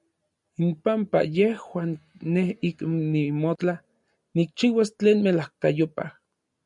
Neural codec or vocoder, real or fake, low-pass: none; real; 10.8 kHz